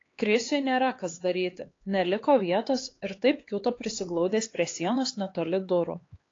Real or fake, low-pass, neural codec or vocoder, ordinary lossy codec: fake; 7.2 kHz; codec, 16 kHz, 4 kbps, X-Codec, HuBERT features, trained on LibriSpeech; AAC, 32 kbps